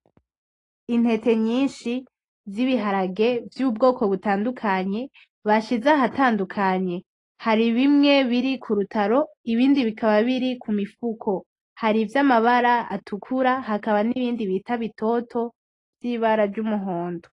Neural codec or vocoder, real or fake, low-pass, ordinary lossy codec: none; real; 10.8 kHz; AAC, 32 kbps